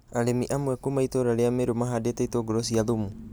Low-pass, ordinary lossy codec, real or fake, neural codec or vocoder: none; none; real; none